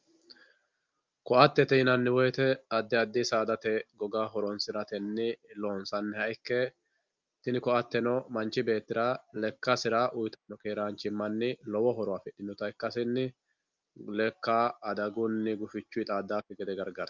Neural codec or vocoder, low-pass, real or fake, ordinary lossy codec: none; 7.2 kHz; real; Opus, 24 kbps